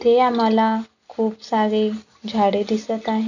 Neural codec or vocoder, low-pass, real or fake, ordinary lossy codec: none; 7.2 kHz; real; none